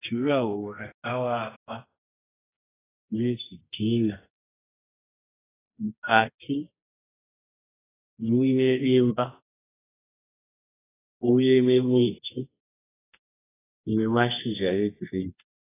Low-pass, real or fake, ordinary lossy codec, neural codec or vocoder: 3.6 kHz; fake; AAC, 16 kbps; codec, 24 kHz, 0.9 kbps, WavTokenizer, medium music audio release